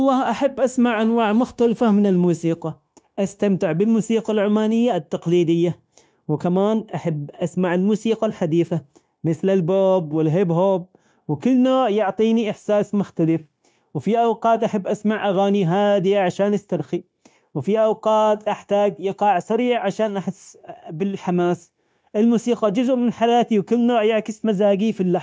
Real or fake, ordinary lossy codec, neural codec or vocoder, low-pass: fake; none; codec, 16 kHz, 0.9 kbps, LongCat-Audio-Codec; none